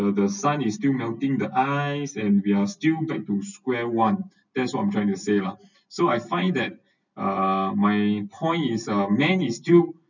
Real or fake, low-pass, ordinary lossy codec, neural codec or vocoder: real; 7.2 kHz; none; none